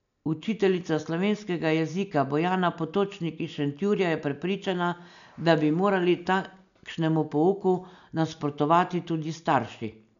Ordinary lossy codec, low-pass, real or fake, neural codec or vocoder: none; 7.2 kHz; real; none